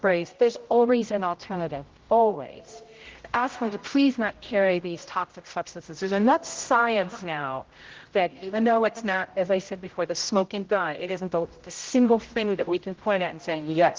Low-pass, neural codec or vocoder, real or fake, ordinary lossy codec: 7.2 kHz; codec, 16 kHz, 0.5 kbps, X-Codec, HuBERT features, trained on general audio; fake; Opus, 16 kbps